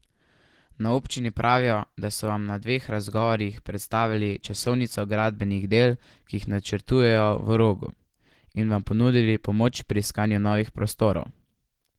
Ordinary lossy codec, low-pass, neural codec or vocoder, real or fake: Opus, 16 kbps; 19.8 kHz; none; real